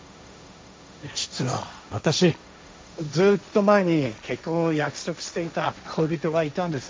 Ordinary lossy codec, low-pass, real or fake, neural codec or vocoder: none; none; fake; codec, 16 kHz, 1.1 kbps, Voila-Tokenizer